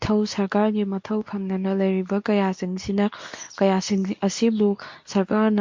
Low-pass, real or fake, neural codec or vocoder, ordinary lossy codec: 7.2 kHz; fake; codec, 24 kHz, 0.9 kbps, WavTokenizer, medium speech release version 2; MP3, 48 kbps